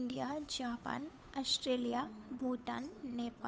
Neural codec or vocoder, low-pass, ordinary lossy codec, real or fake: codec, 16 kHz, 8 kbps, FunCodec, trained on Chinese and English, 25 frames a second; none; none; fake